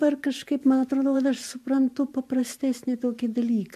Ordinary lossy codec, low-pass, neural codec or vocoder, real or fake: MP3, 64 kbps; 14.4 kHz; vocoder, 44.1 kHz, 128 mel bands every 512 samples, BigVGAN v2; fake